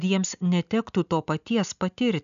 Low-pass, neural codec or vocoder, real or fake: 7.2 kHz; none; real